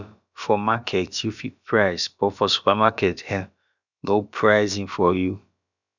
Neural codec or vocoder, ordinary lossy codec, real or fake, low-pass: codec, 16 kHz, about 1 kbps, DyCAST, with the encoder's durations; none; fake; 7.2 kHz